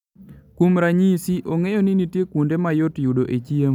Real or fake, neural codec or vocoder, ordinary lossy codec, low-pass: real; none; none; 19.8 kHz